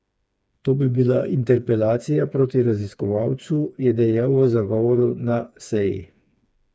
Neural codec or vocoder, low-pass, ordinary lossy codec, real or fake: codec, 16 kHz, 4 kbps, FreqCodec, smaller model; none; none; fake